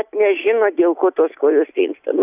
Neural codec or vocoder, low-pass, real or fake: none; 3.6 kHz; real